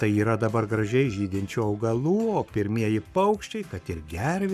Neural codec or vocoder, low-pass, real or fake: codec, 44.1 kHz, 7.8 kbps, Pupu-Codec; 14.4 kHz; fake